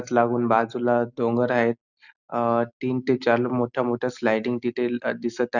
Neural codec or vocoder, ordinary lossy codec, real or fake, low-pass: none; none; real; 7.2 kHz